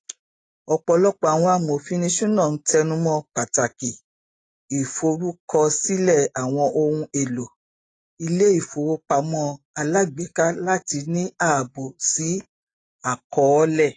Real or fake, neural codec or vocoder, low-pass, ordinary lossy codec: real; none; 9.9 kHz; AAC, 32 kbps